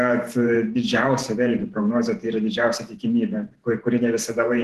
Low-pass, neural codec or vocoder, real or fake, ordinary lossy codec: 14.4 kHz; vocoder, 48 kHz, 128 mel bands, Vocos; fake; Opus, 16 kbps